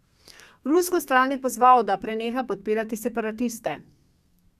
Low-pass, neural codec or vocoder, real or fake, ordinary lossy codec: 14.4 kHz; codec, 32 kHz, 1.9 kbps, SNAC; fake; none